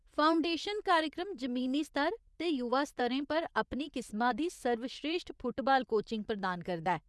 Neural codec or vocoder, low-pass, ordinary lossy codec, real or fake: vocoder, 24 kHz, 100 mel bands, Vocos; none; none; fake